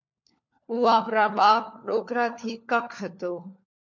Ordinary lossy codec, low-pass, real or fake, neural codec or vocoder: MP3, 48 kbps; 7.2 kHz; fake; codec, 16 kHz, 4 kbps, FunCodec, trained on LibriTTS, 50 frames a second